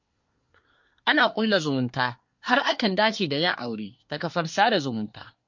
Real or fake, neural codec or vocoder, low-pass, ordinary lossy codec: fake; codec, 24 kHz, 1 kbps, SNAC; 7.2 kHz; MP3, 48 kbps